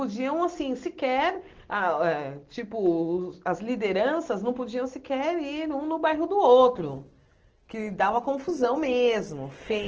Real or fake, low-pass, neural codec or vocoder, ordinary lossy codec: real; 7.2 kHz; none; Opus, 16 kbps